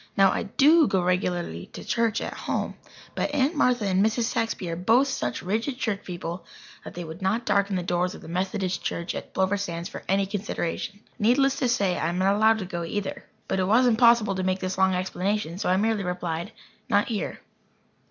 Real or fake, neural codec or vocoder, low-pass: real; none; 7.2 kHz